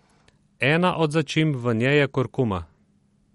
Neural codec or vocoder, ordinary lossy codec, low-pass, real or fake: none; MP3, 48 kbps; 19.8 kHz; real